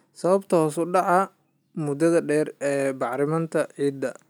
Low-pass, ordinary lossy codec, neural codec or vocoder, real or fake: none; none; none; real